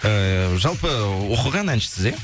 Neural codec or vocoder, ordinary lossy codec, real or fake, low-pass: none; none; real; none